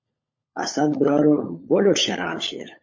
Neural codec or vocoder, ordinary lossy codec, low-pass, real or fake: codec, 16 kHz, 16 kbps, FunCodec, trained on LibriTTS, 50 frames a second; MP3, 32 kbps; 7.2 kHz; fake